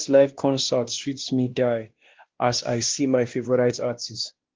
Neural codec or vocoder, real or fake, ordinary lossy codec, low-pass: codec, 16 kHz, 1 kbps, X-Codec, WavLM features, trained on Multilingual LibriSpeech; fake; Opus, 16 kbps; 7.2 kHz